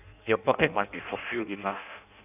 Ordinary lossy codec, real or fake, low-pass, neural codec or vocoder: none; fake; 3.6 kHz; codec, 16 kHz in and 24 kHz out, 0.6 kbps, FireRedTTS-2 codec